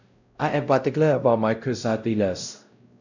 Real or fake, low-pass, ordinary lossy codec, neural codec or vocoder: fake; 7.2 kHz; none; codec, 16 kHz, 0.5 kbps, X-Codec, WavLM features, trained on Multilingual LibriSpeech